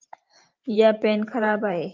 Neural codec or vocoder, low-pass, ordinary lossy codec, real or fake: vocoder, 44.1 kHz, 128 mel bands every 512 samples, BigVGAN v2; 7.2 kHz; Opus, 24 kbps; fake